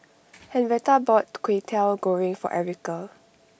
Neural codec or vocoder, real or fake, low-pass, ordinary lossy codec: none; real; none; none